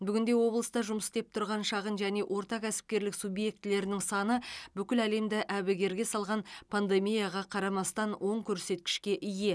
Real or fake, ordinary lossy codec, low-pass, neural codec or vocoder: real; none; none; none